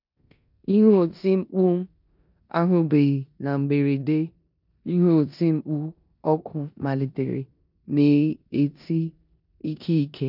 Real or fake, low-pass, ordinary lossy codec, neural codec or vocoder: fake; 5.4 kHz; none; codec, 16 kHz in and 24 kHz out, 0.9 kbps, LongCat-Audio-Codec, four codebook decoder